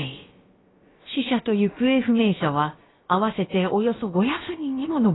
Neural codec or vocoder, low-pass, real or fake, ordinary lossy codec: codec, 16 kHz, about 1 kbps, DyCAST, with the encoder's durations; 7.2 kHz; fake; AAC, 16 kbps